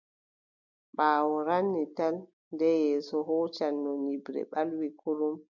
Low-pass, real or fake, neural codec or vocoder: 7.2 kHz; real; none